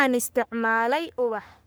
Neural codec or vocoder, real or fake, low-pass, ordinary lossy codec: codec, 44.1 kHz, 3.4 kbps, Pupu-Codec; fake; none; none